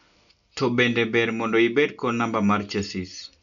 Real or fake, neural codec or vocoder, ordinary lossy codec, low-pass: real; none; none; 7.2 kHz